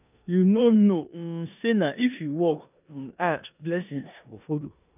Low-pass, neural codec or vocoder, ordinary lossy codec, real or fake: 3.6 kHz; codec, 16 kHz in and 24 kHz out, 0.9 kbps, LongCat-Audio-Codec, four codebook decoder; none; fake